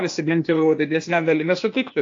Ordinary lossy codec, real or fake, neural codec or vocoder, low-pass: AAC, 48 kbps; fake; codec, 16 kHz, 0.8 kbps, ZipCodec; 7.2 kHz